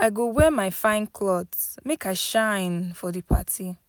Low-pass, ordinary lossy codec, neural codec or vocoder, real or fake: none; none; none; real